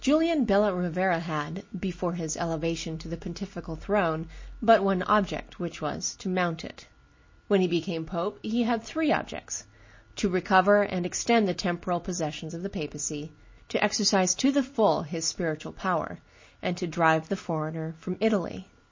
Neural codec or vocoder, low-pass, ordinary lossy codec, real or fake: none; 7.2 kHz; MP3, 32 kbps; real